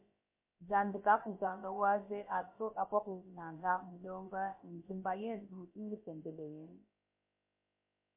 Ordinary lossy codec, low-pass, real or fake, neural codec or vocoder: MP3, 24 kbps; 3.6 kHz; fake; codec, 16 kHz, about 1 kbps, DyCAST, with the encoder's durations